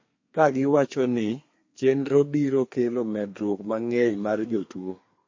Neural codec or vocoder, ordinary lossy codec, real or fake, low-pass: codec, 32 kHz, 1.9 kbps, SNAC; MP3, 32 kbps; fake; 7.2 kHz